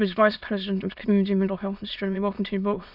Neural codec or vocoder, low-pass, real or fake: autoencoder, 22.05 kHz, a latent of 192 numbers a frame, VITS, trained on many speakers; 5.4 kHz; fake